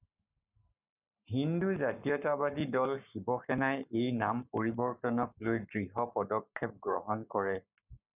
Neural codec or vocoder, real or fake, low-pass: codec, 44.1 kHz, 7.8 kbps, Pupu-Codec; fake; 3.6 kHz